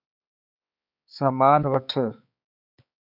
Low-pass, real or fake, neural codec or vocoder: 5.4 kHz; fake; codec, 16 kHz, 4 kbps, X-Codec, HuBERT features, trained on balanced general audio